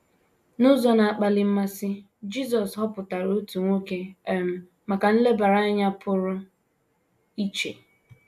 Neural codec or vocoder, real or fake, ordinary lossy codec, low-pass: none; real; AAC, 96 kbps; 14.4 kHz